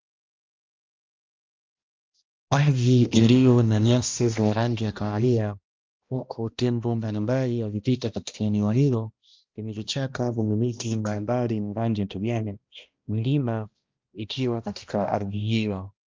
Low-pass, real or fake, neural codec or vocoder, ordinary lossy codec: 7.2 kHz; fake; codec, 16 kHz, 1 kbps, X-Codec, HuBERT features, trained on balanced general audio; Opus, 32 kbps